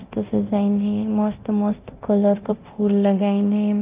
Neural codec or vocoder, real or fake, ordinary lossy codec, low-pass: codec, 24 kHz, 0.9 kbps, DualCodec; fake; Opus, 24 kbps; 3.6 kHz